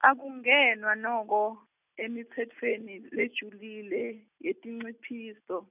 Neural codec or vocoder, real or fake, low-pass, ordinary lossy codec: none; real; 3.6 kHz; none